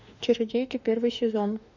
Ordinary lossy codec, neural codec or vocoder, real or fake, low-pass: none; autoencoder, 48 kHz, 32 numbers a frame, DAC-VAE, trained on Japanese speech; fake; 7.2 kHz